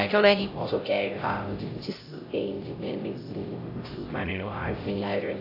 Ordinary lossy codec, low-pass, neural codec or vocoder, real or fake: AAC, 32 kbps; 5.4 kHz; codec, 16 kHz, 0.5 kbps, X-Codec, HuBERT features, trained on LibriSpeech; fake